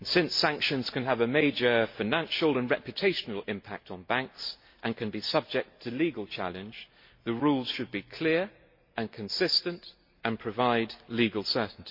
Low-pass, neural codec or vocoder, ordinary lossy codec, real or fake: 5.4 kHz; none; MP3, 32 kbps; real